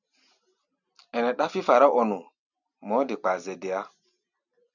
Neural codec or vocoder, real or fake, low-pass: none; real; 7.2 kHz